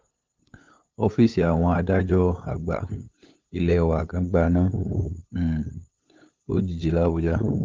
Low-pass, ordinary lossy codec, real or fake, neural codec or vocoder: 7.2 kHz; Opus, 24 kbps; fake; codec, 16 kHz, 8 kbps, FunCodec, trained on LibriTTS, 25 frames a second